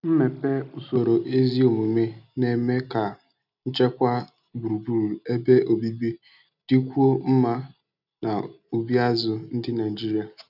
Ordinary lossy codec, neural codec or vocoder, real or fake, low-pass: none; none; real; 5.4 kHz